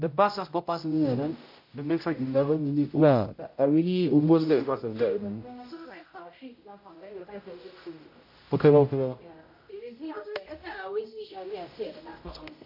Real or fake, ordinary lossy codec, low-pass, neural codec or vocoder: fake; MP3, 32 kbps; 5.4 kHz; codec, 16 kHz, 0.5 kbps, X-Codec, HuBERT features, trained on general audio